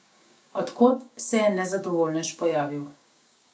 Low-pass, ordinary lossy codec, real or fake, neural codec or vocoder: none; none; fake; codec, 16 kHz, 6 kbps, DAC